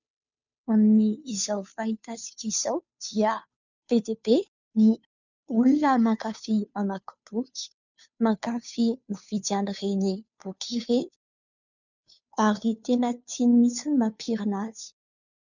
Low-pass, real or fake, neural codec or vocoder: 7.2 kHz; fake; codec, 16 kHz, 2 kbps, FunCodec, trained on Chinese and English, 25 frames a second